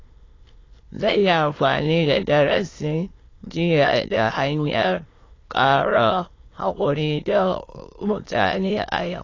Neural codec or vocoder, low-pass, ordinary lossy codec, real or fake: autoencoder, 22.05 kHz, a latent of 192 numbers a frame, VITS, trained on many speakers; 7.2 kHz; AAC, 32 kbps; fake